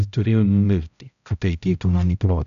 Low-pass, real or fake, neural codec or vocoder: 7.2 kHz; fake; codec, 16 kHz, 0.5 kbps, X-Codec, HuBERT features, trained on balanced general audio